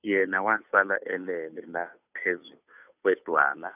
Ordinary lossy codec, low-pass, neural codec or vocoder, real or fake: none; 3.6 kHz; codec, 16 kHz, 2 kbps, FunCodec, trained on Chinese and English, 25 frames a second; fake